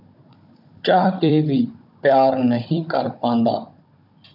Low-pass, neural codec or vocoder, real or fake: 5.4 kHz; codec, 16 kHz, 16 kbps, FunCodec, trained on Chinese and English, 50 frames a second; fake